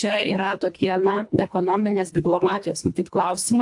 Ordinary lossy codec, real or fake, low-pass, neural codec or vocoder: AAC, 64 kbps; fake; 10.8 kHz; codec, 24 kHz, 1.5 kbps, HILCodec